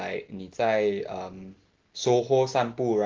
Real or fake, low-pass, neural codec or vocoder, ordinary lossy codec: real; 7.2 kHz; none; Opus, 16 kbps